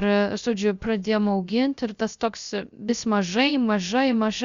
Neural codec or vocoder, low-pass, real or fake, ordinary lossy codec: codec, 16 kHz, 0.3 kbps, FocalCodec; 7.2 kHz; fake; Opus, 64 kbps